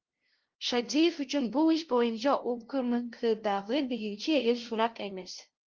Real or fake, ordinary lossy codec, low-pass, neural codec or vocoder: fake; Opus, 16 kbps; 7.2 kHz; codec, 16 kHz, 0.5 kbps, FunCodec, trained on LibriTTS, 25 frames a second